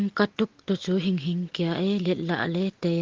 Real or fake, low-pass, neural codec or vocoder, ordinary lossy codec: real; 7.2 kHz; none; Opus, 16 kbps